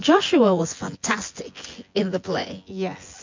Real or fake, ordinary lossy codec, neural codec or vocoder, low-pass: fake; MP3, 48 kbps; vocoder, 24 kHz, 100 mel bands, Vocos; 7.2 kHz